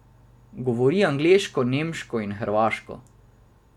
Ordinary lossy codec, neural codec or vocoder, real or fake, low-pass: none; none; real; 19.8 kHz